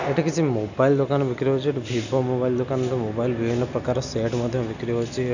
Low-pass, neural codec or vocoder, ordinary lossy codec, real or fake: 7.2 kHz; none; none; real